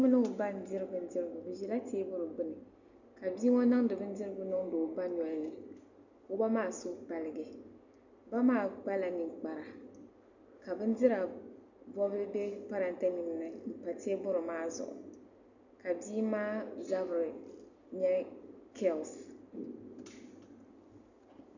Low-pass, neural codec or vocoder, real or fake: 7.2 kHz; none; real